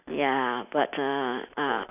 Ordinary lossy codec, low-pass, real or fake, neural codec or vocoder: none; 3.6 kHz; real; none